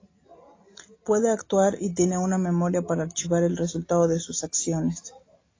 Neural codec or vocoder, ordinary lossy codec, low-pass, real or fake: none; AAC, 32 kbps; 7.2 kHz; real